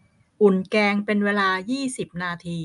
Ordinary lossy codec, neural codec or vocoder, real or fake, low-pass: none; none; real; 10.8 kHz